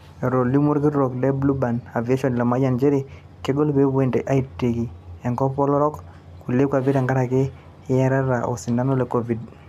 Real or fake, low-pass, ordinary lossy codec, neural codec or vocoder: real; 14.4 kHz; MP3, 96 kbps; none